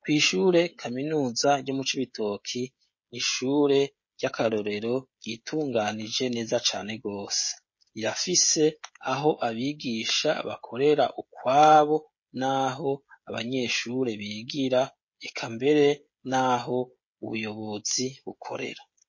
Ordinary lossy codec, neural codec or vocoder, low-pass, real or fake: MP3, 32 kbps; codec, 16 kHz, 16 kbps, FreqCodec, smaller model; 7.2 kHz; fake